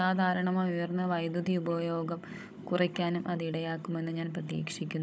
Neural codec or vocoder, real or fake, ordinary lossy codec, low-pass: codec, 16 kHz, 16 kbps, FunCodec, trained on Chinese and English, 50 frames a second; fake; none; none